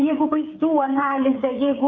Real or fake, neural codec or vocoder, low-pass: fake; codec, 16 kHz, 8 kbps, FreqCodec, smaller model; 7.2 kHz